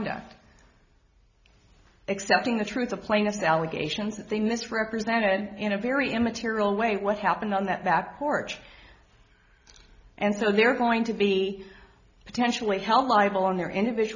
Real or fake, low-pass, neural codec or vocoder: real; 7.2 kHz; none